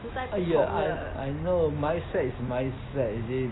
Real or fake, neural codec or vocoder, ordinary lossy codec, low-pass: real; none; AAC, 16 kbps; 7.2 kHz